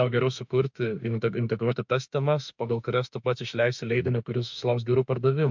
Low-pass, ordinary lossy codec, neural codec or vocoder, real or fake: 7.2 kHz; MP3, 64 kbps; autoencoder, 48 kHz, 32 numbers a frame, DAC-VAE, trained on Japanese speech; fake